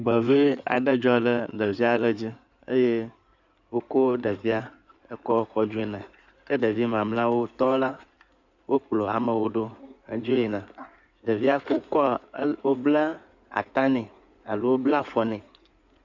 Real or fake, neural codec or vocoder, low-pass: fake; codec, 16 kHz in and 24 kHz out, 2.2 kbps, FireRedTTS-2 codec; 7.2 kHz